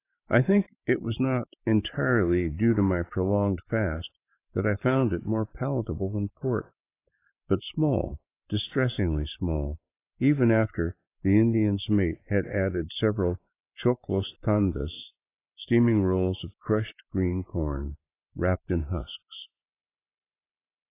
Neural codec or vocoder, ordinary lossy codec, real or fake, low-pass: vocoder, 44.1 kHz, 128 mel bands every 512 samples, BigVGAN v2; AAC, 24 kbps; fake; 3.6 kHz